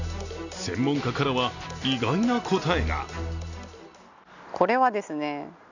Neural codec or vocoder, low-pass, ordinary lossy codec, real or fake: none; 7.2 kHz; none; real